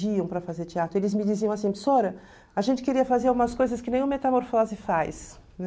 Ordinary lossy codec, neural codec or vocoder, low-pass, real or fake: none; none; none; real